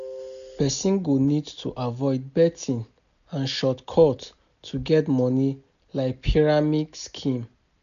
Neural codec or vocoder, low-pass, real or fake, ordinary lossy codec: none; 7.2 kHz; real; none